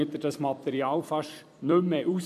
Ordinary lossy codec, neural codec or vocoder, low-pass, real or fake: none; vocoder, 44.1 kHz, 128 mel bands every 256 samples, BigVGAN v2; 14.4 kHz; fake